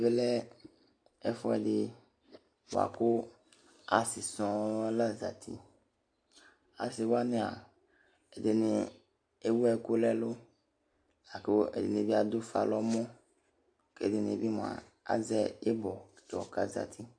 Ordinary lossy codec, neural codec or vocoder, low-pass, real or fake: AAC, 64 kbps; none; 9.9 kHz; real